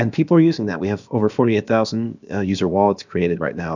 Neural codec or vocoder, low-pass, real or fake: codec, 16 kHz, about 1 kbps, DyCAST, with the encoder's durations; 7.2 kHz; fake